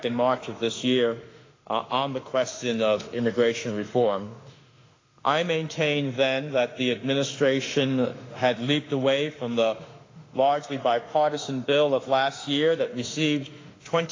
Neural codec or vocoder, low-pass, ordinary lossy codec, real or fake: autoencoder, 48 kHz, 32 numbers a frame, DAC-VAE, trained on Japanese speech; 7.2 kHz; AAC, 32 kbps; fake